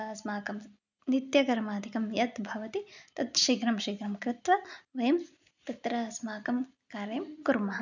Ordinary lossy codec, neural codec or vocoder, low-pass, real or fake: none; none; 7.2 kHz; real